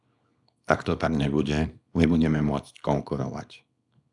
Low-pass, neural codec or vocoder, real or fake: 10.8 kHz; codec, 24 kHz, 0.9 kbps, WavTokenizer, small release; fake